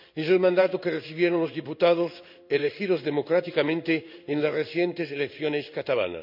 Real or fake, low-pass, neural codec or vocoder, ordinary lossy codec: fake; 5.4 kHz; codec, 16 kHz in and 24 kHz out, 1 kbps, XY-Tokenizer; none